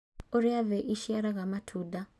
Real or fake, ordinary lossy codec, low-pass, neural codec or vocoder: real; none; none; none